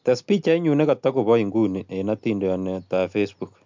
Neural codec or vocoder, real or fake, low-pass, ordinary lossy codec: none; real; 7.2 kHz; MP3, 64 kbps